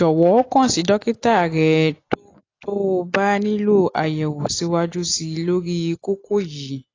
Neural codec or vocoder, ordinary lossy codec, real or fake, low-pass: none; AAC, 32 kbps; real; 7.2 kHz